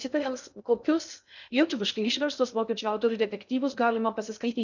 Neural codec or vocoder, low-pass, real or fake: codec, 16 kHz in and 24 kHz out, 0.6 kbps, FocalCodec, streaming, 2048 codes; 7.2 kHz; fake